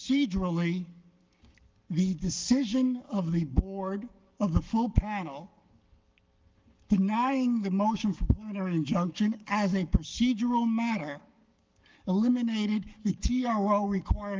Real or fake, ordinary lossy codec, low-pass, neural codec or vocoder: fake; Opus, 32 kbps; 7.2 kHz; codec, 44.1 kHz, 7.8 kbps, Pupu-Codec